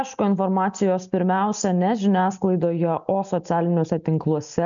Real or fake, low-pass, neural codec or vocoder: real; 7.2 kHz; none